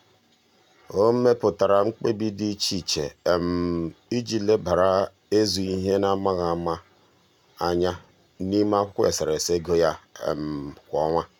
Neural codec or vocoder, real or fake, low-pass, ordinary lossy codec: none; real; 19.8 kHz; none